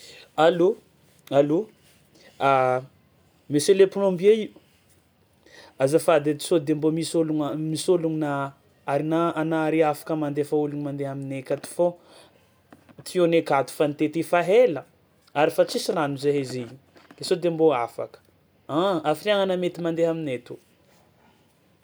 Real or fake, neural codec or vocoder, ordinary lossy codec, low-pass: real; none; none; none